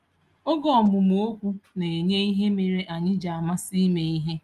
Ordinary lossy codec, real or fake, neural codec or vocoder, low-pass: Opus, 32 kbps; real; none; 14.4 kHz